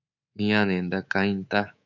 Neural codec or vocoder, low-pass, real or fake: codec, 24 kHz, 3.1 kbps, DualCodec; 7.2 kHz; fake